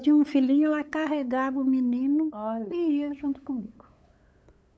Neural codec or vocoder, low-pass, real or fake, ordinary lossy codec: codec, 16 kHz, 8 kbps, FunCodec, trained on LibriTTS, 25 frames a second; none; fake; none